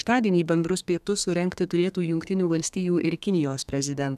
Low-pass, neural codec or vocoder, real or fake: 14.4 kHz; codec, 32 kHz, 1.9 kbps, SNAC; fake